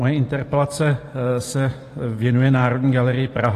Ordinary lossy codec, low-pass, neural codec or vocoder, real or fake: AAC, 48 kbps; 14.4 kHz; vocoder, 44.1 kHz, 128 mel bands every 512 samples, BigVGAN v2; fake